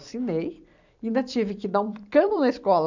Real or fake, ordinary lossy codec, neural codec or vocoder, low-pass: fake; none; vocoder, 44.1 kHz, 128 mel bands every 256 samples, BigVGAN v2; 7.2 kHz